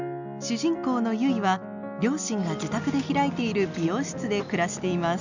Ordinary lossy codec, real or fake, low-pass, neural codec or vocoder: none; real; 7.2 kHz; none